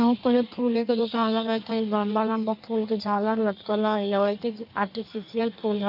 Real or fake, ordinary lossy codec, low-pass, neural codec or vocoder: fake; none; 5.4 kHz; codec, 16 kHz in and 24 kHz out, 1.1 kbps, FireRedTTS-2 codec